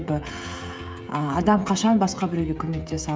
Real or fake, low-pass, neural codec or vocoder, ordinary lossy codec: fake; none; codec, 16 kHz, 16 kbps, FreqCodec, smaller model; none